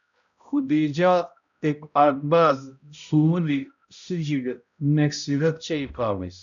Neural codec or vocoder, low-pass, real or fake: codec, 16 kHz, 0.5 kbps, X-Codec, HuBERT features, trained on balanced general audio; 7.2 kHz; fake